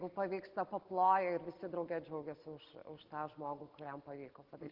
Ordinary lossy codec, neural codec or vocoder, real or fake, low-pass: Opus, 16 kbps; none; real; 5.4 kHz